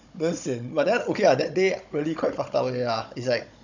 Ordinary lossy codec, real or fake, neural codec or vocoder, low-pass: none; fake; codec, 16 kHz, 16 kbps, FunCodec, trained on Chinese and English, 50 frames a second; 7.2 kHz